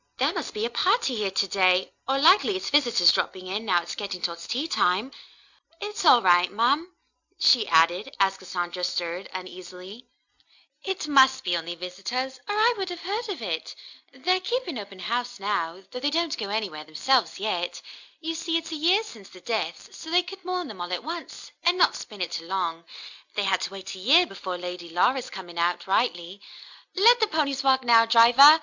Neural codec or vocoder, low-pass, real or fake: none; 7.2 kHz; real